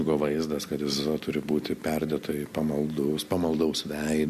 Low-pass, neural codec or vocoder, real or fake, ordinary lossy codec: 14.4 kHz; none; real; MP3, 64 kbps